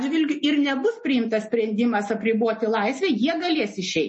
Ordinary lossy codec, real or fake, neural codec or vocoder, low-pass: MP3, 32 kbps; real; none; 10.8 kHz